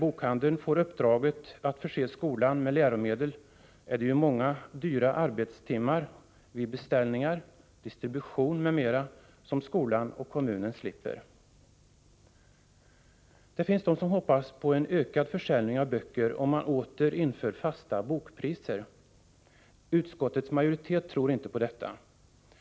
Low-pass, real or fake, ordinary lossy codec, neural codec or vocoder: none; real; none; none